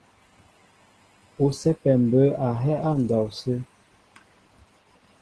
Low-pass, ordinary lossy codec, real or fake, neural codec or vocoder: 10.8 kHz; Opus, 16 kbps; real; none